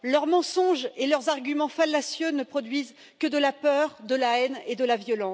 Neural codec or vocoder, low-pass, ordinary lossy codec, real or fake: none; none; none; real